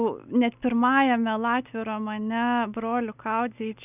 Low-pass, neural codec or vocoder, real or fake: 3.6 kHz; none; real